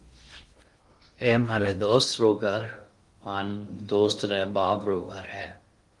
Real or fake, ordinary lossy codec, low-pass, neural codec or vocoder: fake; Opus, 24 kbps; 10.8 kHz; codec, 16 kHz in and 24 kHz out, 0.6 kbps, FocalCodec, streaming, 2048 codes